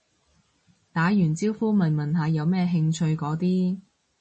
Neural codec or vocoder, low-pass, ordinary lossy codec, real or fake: none; 10.8 kHz; MP3, 32 kbps; real